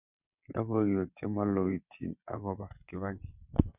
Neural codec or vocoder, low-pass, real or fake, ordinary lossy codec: vocoder, 22.05 kHz, 80 mel bands, WaveNeXt; 3.6 kHz; fake; none